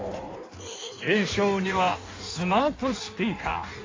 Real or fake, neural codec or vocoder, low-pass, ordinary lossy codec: fake; codec, 16 kHz in and 24 kHz out, 1.1 kbps, FireRedTTS-2 codec; 7.2 kHz; AAC, 32 kbps